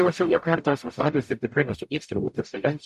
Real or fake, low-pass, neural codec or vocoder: fake; 14.4 kHz; codec, 44.1 kHz, 0.9 kbps, DAC